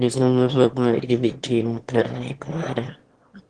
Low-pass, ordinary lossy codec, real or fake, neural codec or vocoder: 9.9 kHz; Opus, 16 kbps; fake; autoencoder, 22.05 kHz, a latent of 192 numbers a frame, VITS, trained on one speaker